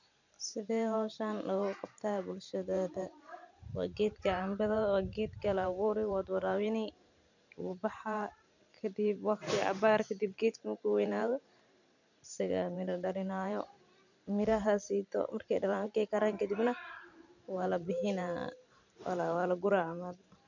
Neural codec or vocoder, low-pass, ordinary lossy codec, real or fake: vocoder, 44.1 kHz, 128 mel bands every 512 samples, BigVGAN v2; 7.2 kHz; none; fake